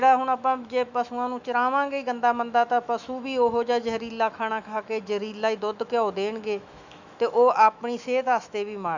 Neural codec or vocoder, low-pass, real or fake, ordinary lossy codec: autoencoder, 48 kHz, 128 numbers a frame, DAC-VAE, trained on Japanese speech; 7.2 kHz; fake; none